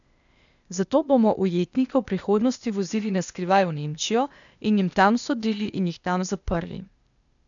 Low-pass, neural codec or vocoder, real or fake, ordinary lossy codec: 7.2 kHz; codec, 16 kHz, 0.8 kbps, ZipCodec; fake; none